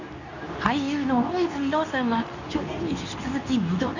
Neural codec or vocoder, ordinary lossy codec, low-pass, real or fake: codec, 24 kHz, 0.9 kbps, WavTokenizer, medium speech release version 2; none; 7.2 kHz; fake